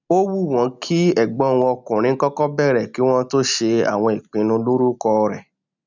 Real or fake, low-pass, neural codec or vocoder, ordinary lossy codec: real; 7.2 kHz; none; none